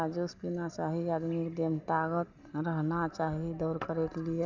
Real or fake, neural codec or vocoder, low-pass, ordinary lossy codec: real; none; 7.2 kHz; none